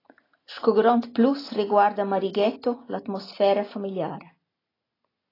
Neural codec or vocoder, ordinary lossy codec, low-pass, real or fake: none; AAC, 24 kbps; 5.4 kHz; real